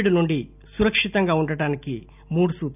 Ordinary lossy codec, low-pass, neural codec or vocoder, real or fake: none; 3.6 kHz; none; real